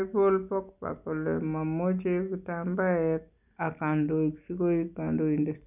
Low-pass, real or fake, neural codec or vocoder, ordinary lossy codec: 3.6 kHz; real; none; none